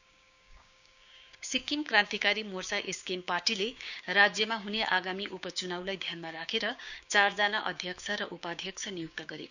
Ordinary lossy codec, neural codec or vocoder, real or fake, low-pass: none; codec, 16 kHz, 6 kbps, DAC; fake; 7.2 kHz